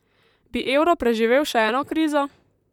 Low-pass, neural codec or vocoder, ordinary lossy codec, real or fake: 19.8 kHz; vocoder, 44.1 kHz, 128 mel bands, Pupu-Vocoder; none; fake